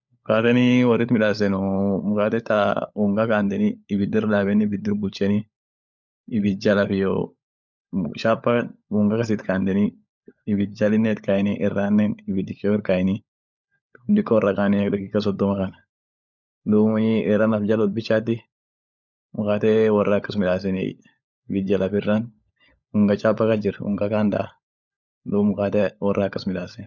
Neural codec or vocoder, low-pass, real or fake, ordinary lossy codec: codec, 16 kHz, 16 kbps, FunCodec, trained on LibriTTS, 50 frames a second; 7.2 kHz; fake; none